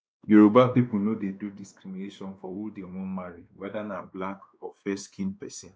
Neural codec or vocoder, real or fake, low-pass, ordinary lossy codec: codec, 16 kHz, 2 kbps, X-Codec, WavLM features, trained on Multilingual LibriSpeech; fake; none; none